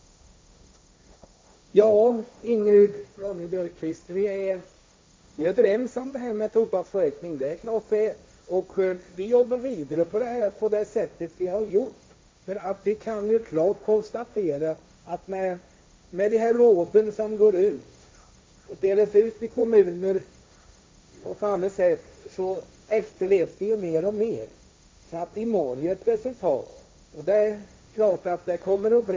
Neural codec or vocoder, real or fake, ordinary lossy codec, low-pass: codec, 16 kHz, 1.1 kbps, Voila-Tokenizer; fake; none; none